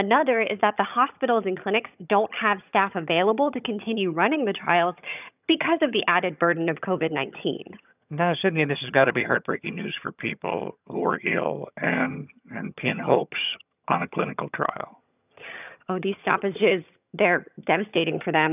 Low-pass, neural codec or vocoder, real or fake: 3.6 kHz; vocoder, 22.05 kHz, 80 mel bands, HiFi-GAN; fake